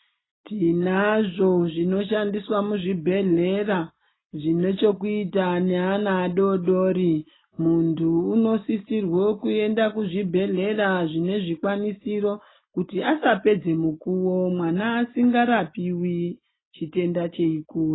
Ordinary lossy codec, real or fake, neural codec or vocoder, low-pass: AAC, 16 kbps; real; none; 7.2 kHz